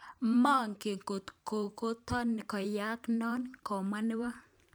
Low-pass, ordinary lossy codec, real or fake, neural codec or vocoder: none; none; fake; vocoder, 44.1 kHz, 128 mel bands every 512 samples, BigVGAN v2